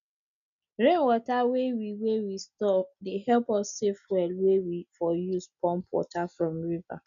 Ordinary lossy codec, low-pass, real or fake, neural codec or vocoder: none; 7.2 kHz; real; none